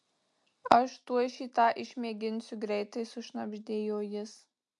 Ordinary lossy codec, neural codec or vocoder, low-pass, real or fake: MP3, 64 kbps; none; 10.8 kHz; real